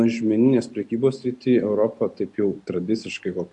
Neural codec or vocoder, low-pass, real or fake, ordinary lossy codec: none; 10.8 kHz; real; MP3, 64 kbps